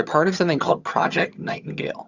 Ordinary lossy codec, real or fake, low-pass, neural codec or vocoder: Opus, 64 kbps; fake; 7.2 kHz; vocoder, 22.05 kHz, 80 mel bands, HiFi-GAN